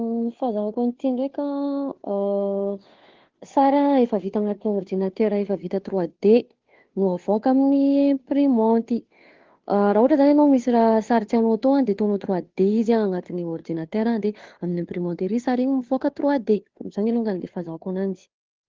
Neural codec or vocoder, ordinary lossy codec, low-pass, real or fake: codec, 16 kHz, 2 kbps, FunCodec, trained on Chinese and English, 25 frames a second; Opus, 16 kbps; 7.2 kHz; fake